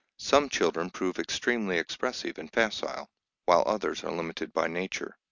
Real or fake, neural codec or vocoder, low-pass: real; none; 7.2 kHz